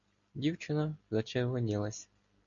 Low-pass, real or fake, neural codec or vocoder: 7.2 kHz; real; none